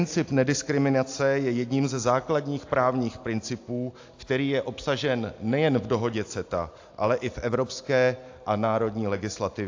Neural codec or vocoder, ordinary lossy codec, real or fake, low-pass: none; AAC, 48 kbps; real; 7.2 kHz